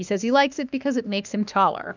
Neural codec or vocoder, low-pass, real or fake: autoencoder, 48 kHz, 32 numbers a frame, DAC-VAE, trained on Japanese speech; 7.2 kHz; fake